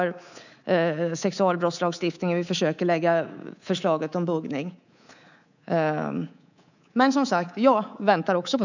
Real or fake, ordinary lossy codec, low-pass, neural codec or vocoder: fake; none; 7.2 kHz; codec, 24 kHz, 3.1 kbps, DualCodec